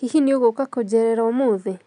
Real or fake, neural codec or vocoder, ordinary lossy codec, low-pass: real; none; none; 10.8 kHz